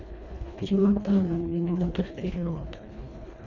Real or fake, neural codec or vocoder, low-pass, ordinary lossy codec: fake; codec, 24 kHz, 1.5 kbps, HILCodec; 7.2 kHz; none